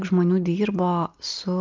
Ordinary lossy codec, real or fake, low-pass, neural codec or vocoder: Opus, 32 kbps; real; 7.2 kHz; none